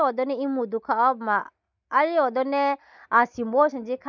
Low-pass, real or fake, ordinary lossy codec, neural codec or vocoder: 7.2 kHz; real; none; none